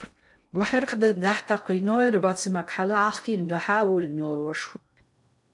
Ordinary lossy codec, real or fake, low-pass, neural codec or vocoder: MP3, 96 kbps; fake; 10.8 kHz; codec, 16 kHz in and 24 kHz out, 0.6 kbps, FocalCodec, streaming, 2048 codes